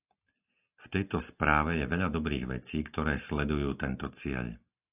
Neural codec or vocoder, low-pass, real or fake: none; 3.6 kHz; real